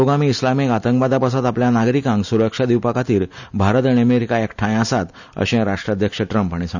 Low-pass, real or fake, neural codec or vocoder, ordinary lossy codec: 7.2 kHz; real; none; none